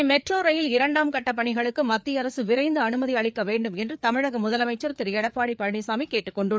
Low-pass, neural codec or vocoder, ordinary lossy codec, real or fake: none; codec, 16 kHz, 4 kbps, FreqCodec, larger model; none; fake